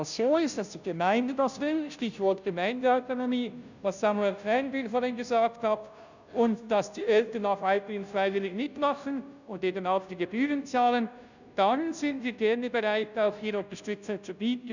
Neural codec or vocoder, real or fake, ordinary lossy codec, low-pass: codec, 16 kHz, 0.5 kbps, FunCodec, trained on Chinese and English, 25 frames a second; fake; none; 7.2 kHz